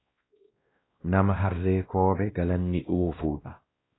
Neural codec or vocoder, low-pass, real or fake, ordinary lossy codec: codec, 16 kHz, 1 kbps, X-Codec, WavLM features, trained on Multilingual LibriSpeech; 7.2 kHz; fake; AAC, 16 kbps